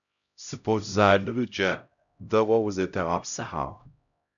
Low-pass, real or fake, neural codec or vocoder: 7.2 kHz; fake; codec, 16 kHz, 0.5 kbps, X-Codec, HuBERT features, trained on LibriSpeech